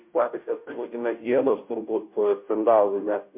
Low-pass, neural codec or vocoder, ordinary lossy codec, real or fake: 3.6 kHz; codec, 16 kHz, 0.5 kbps, FunCodec, trained on Chinese and English, 25 frames a second; MP3, 32 kbps; fake